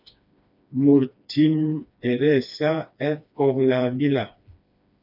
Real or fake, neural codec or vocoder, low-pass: fake; codec, 16 kHz, 2 kbps, FreqCodec, smaller model; 5.4 kHz